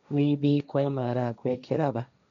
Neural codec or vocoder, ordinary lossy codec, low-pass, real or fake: codec, 16 kHz, 1.1 kbps, Voila-Tokenizer; none; 7.2 kHz; fake